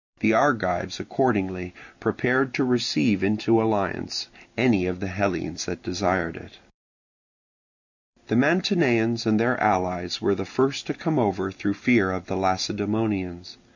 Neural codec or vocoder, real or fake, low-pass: none; real; 7.2 kHz